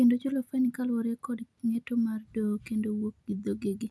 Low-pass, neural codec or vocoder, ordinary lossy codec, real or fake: none; none; none; real